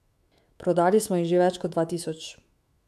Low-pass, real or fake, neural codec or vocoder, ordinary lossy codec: 14.4 kHz; fake; autoencoder, 48 kHz, 128 numbers a frame, DAC-VAE, trained on Japanese speech; AAC, 96 kbps